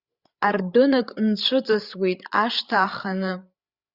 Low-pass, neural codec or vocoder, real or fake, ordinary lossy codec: 5.4 kHz; codec, 16 kHz, 8 kbps, FreqCodec, larger model; fake; Opus, 64 kbps